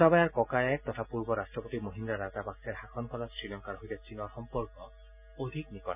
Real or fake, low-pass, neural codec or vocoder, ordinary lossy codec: real; 3.6 kHz; none; none